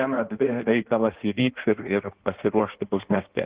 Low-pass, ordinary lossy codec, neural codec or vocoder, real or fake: 3.6 kHz; Opus, 16 kbps; codec, 16 kHz in and 24 kHz out, 1.1 kbps, FireRedTTS-2 codec; fake